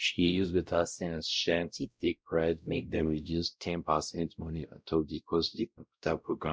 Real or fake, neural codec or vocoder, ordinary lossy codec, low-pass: fake; codec, 16 kHz, 0.5 kbps, X-Codec, WavLM features, trained on Multilingual LibriSpeech; none; none